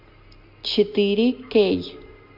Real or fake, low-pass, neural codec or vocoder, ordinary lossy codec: real; 5.4 kHz; none; MP3, 48 kbps